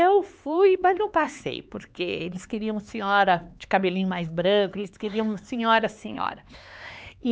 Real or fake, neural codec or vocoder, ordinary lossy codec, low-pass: fake; codec, 16 kHz, 4 kbps, X-Codec, HuBERT features, trained on LibriSpeech; none; none